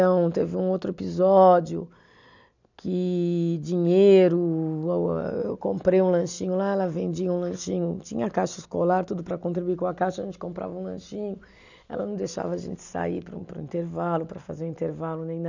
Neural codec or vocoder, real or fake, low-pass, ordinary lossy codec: none; real; 7.2 kHz; none